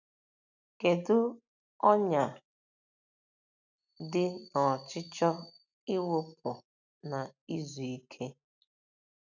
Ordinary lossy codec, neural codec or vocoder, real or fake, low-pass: none; none; real; 7.2 kHz